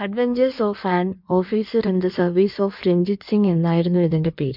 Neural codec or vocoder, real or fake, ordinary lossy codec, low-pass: codec, 16 kHz in and 24 kHz out, 1.1 kbps, FireRedTTS-2 codec; fake; MP3, 48 kbps; 5.4 kHz